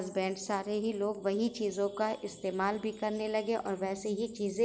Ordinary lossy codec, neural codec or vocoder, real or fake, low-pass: none; none; real; none